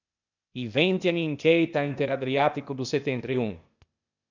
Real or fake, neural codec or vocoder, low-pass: fake; codec, 16 kHz, 0.8 kbps, ZipCodec; 7.2 kHz